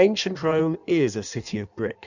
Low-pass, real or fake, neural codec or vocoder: 7.2 kHz; fake; codec, 16 kHz in and 24 kHz out, 1.1 kbps, FireRedTTS-2 codec